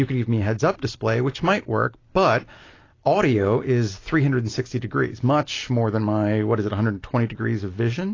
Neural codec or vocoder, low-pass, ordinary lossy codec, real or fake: none; 7.2 kHz; AAC, 32 kbps; real